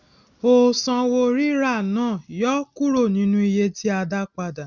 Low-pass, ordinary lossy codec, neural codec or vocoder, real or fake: 7.2 kHz; none; none; real